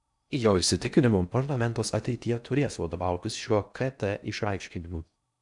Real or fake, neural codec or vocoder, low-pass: fake; codec, 16 kHz in and 24 kHz out, 0.6 kbps, FocalCodec, streaming, 4096 codes; 10.8 kHz